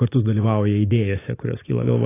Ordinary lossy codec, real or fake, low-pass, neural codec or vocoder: AAC, 16 kbps; real; 3.6 kHz; none